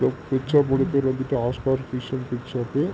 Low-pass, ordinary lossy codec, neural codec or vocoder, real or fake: none; none; none; real